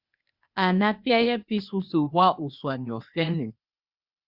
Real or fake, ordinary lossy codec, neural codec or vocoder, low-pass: fake; Opus, 64 kbps; codec, 16 kHz, 0.8 kbps, ZipCodec; 5.4 kHz